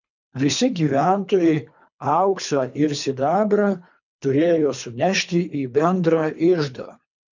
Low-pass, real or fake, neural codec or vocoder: 7.2 kHz; fake; codec, 24 kHz, 3 kbps, HILCodec